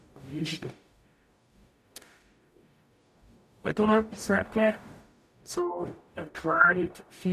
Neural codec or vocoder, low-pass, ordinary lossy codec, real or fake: codec, 44.1 kHz, 0.9 kbps, DAC; 14.4 kHz; none; fake